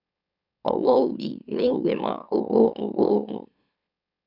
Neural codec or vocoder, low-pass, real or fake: autoencoder, 44.1 kHz, a latent of 192 numbers a frame, MeloTTS; 5.4 kHz; fake